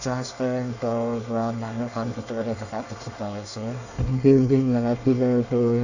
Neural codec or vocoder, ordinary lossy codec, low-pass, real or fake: codec, 24 kHz, 1 kbps, SNAC; none; 7.2 kHz; fake